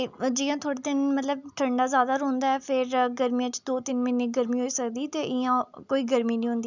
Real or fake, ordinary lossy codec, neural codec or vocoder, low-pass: real; none; none; 7.2 kHz